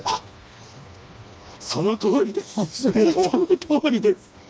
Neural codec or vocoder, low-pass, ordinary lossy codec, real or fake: codec, 16 kHz, 2 kbps, FreqCodec, smaller model; none; none; fake